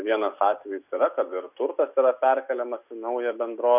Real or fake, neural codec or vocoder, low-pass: real; none; 3.6 kHz